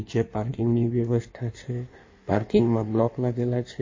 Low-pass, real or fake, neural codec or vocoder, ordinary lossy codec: 7.2 kHz; fake; codec, 16 kHz in and 24 kHz out, 1.1 kbps, FireRedTTS-2 codec; MP3, 32 kbps